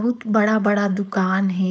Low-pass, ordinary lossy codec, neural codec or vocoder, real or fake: none; none; codec, 16 kHz, 4.8 kbps, FACodec; fake